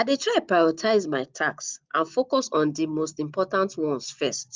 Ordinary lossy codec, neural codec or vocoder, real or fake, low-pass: Opus, 32 kbps; none; real; 7.2 kHz